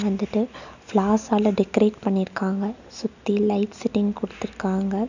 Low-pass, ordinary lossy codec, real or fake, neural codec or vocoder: 7.2 kHz; none; real; none